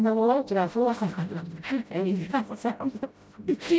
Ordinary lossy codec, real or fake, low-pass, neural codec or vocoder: none; fake; none; codec, 16 kHz, 0.5 kbps, FreqCodec, smaller model